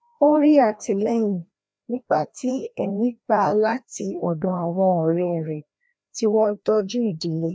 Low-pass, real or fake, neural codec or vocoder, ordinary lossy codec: none; fake; codec, 16 kHz, 1 kbps, FreqCodec, larger model; none